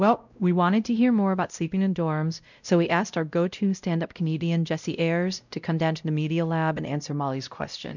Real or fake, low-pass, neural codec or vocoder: fake; 7.2 kHz; codec, 16 kHz, 0.5 kbps, X-Codec, WavLM features, trained on Multilingual LibriSpeech